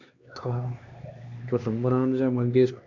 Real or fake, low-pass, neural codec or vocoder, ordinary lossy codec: fake; 7.2 kHz; codec, 16 kHz, 2 kbps, X-Codec, HuBERT features, trained on LibriSpeech; Opus, 64 kbps